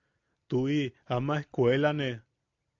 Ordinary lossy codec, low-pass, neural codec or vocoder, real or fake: AAC, 48 kbps; 7.2 kHz; none; real